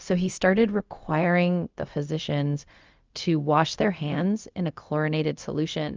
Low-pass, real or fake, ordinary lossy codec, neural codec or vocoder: 7.2 kHz; fake; Opus, 24 kbps; codec, 16 kHz, 0.4 kbps, LongCat-Audio-Codec